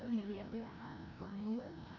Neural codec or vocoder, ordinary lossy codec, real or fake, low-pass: codec, 16 kHz, 1 kbps, FreqCodec, larger model; none; fake; 7.2 kHz